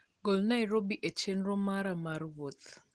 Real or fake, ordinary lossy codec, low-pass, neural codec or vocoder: real; Opus, 16 kbps; 10.8 kHz; none